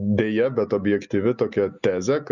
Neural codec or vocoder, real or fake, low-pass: none; real; 7.2 kHz